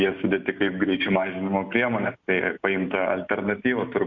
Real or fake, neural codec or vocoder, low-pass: real; none; 7.2 kHz